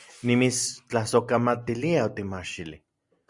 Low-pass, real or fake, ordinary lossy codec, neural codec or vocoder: 10.8 kHz; real; Opus, 64 kbps; none